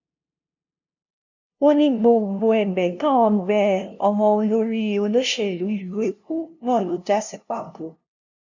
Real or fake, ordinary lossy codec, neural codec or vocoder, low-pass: fake; none; codec, 16 kHz, 0.5 kbps, FunCodec, trained on LibriTTS, 25 frames a second; 7.2 kHz